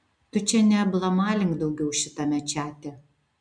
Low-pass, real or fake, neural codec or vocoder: 9.9 kHz; real; none